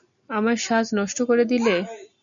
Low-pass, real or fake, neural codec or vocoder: 7.2 kHz; real; none